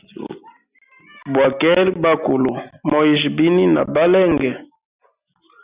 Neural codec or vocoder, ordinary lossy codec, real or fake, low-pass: none; Opus, 64 kbps; real; 3.6 kHz